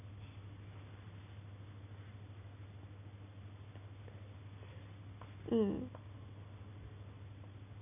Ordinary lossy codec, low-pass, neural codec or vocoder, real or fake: none; 3.6 kHz; none; real